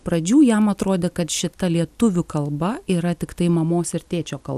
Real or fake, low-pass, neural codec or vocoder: real; 14.4 kHz; none